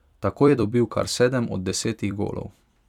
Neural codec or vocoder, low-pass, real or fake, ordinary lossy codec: vocoder, 44.1 kHz, 128 mel bands every 256 samples, BigVGAN v2; 19.8 kHz; fake; none